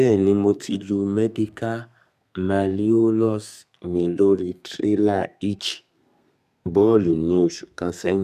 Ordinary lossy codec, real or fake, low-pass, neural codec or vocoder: none; fake; 14.4 kHz; codec, 32 kHz, 1.9 kbps, SNAC